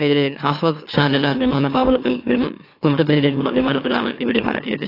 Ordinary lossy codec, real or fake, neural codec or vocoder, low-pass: AAC, 32 kbps; fake; autoencoder, 44.1 kHz, a latent of 192 numbers a frame, MeloTTS; 5.4 kHz